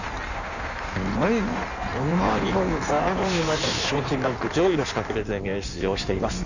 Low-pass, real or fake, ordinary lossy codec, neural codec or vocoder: 7.2 kHz; fake; none; codec, 16 kHz in and 24 kHz out, 1.1 kbps, FireRedTTS-2 codec